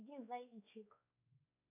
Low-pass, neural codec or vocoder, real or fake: 3.6 kHz; codec, 16 kHz, 4 kbps, X-Codec, WavLM features, trained on Multilingual LibriSpeech; fake